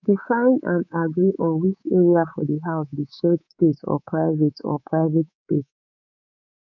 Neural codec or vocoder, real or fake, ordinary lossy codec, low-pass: codec, 24 kHz, 3.1 kbps, DualCodec; fake; none; 7.2 kHz